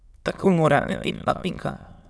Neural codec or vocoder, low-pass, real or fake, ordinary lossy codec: autoencoder, 22.05 kHz, a latent of 192 numbers a frame, VITS, trained on many speakers; none; fake; none